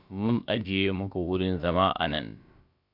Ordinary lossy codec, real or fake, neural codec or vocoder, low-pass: MP3, 48 kbps; fake; codec, 16 kHz, about 1 kbps, DyCAST, with the encoder's durations; 5.4 kHz